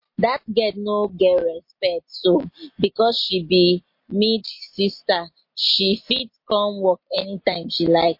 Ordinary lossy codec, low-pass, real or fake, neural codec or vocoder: MP3, 32 kbps; 5.4 kHz; real; none